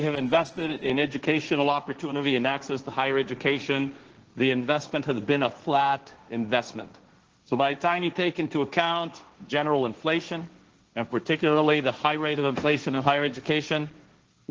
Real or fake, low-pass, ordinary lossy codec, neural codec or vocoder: fake; 7.2 kHz; Opus, 16 kbps; codec, 16 kHz, 1.1 kbps, Voila-Tokenizer